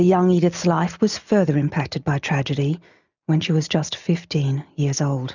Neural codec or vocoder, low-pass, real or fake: none; 7.2 kHz; real